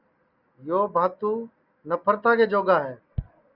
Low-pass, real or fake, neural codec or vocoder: 5.4 kHz; real; none